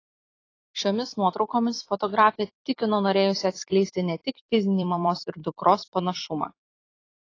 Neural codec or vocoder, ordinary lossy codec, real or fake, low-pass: vocoder, 44.1 kHz, 128 mel bands every 256 samples, BigVGAN v2; AAC, 32 kbps; fake; 7.2 kHz